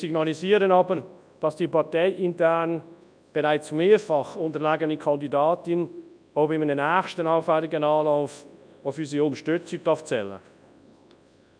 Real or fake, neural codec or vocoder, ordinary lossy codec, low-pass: fake; codec, 24 kHz, 0.9 kbps, WavTokenizer, large speech release; none; 9.9 kHz